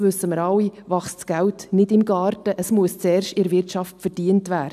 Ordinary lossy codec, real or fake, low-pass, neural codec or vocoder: none; real; 14.4 kHz; none